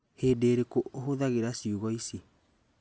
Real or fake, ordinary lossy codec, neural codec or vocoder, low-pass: real; none; none; none